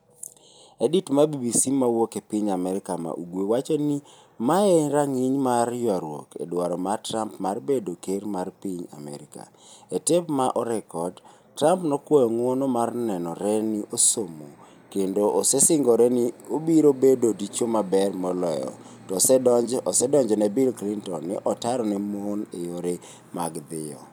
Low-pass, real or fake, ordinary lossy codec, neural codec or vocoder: none; real; none; none